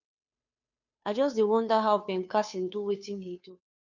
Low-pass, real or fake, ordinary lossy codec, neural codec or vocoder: 7.2 kHz; fake; none; codec, 16 kHz, 2 kbps, FunCodec, trained on Chinese and English, 25 frames a second